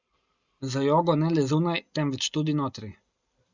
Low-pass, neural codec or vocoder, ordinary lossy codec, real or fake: 7.2 kHz; none; Opus, 64 kbps; real